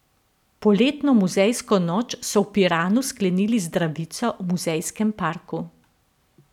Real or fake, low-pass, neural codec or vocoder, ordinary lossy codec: real; 19.8 kHz; none; none